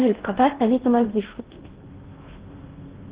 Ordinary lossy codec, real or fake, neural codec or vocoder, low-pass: Opus, 16 kbps; fake; codec, 16 kHz in and 24 kHz out, 0.6 kbps, FocalCodec, streaming, 4096 codes; 3.6 kHz